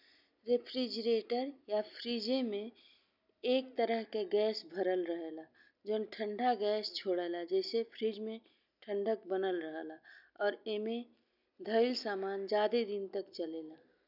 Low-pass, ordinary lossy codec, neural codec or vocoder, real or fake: 5.4 kHz; none; none; real